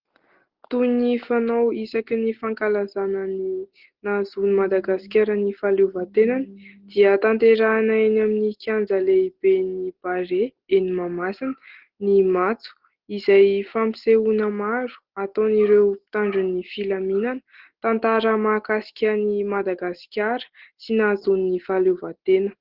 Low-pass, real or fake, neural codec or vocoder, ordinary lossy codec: 5.4 kHz; real; none; Opus, 16 kbps